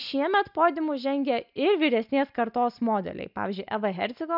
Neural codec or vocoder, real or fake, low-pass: none; real; 5.4 kHz